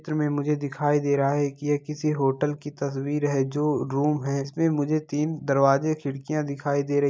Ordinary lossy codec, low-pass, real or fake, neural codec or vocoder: none; none; real; none